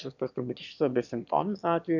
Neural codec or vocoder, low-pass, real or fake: autoencoder, 22.05 kHz, a latent of 192 numbers a frame, VITS, trained on one speaker; 7.2 kHz; fake